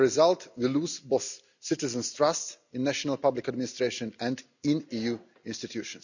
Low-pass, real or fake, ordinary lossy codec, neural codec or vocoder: 7.2 kHz; real; none; none